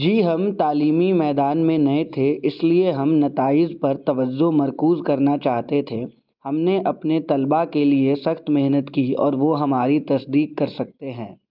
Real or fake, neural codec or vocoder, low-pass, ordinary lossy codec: real; none; 5.4 kHz; Opus, 24 kbps